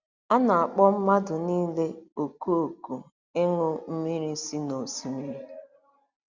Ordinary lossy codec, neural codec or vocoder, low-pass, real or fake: Opus, 64 kbps; none; 7.2 kHz; real